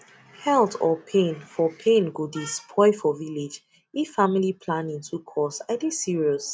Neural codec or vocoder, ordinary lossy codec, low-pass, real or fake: none; none; none; real